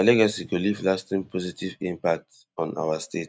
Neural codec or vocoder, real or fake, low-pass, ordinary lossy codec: none; real; none; none